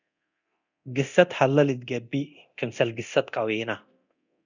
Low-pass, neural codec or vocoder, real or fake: 7.2 kHz; codec, 24 kHz, 0.9 kbps, DualCodec; fake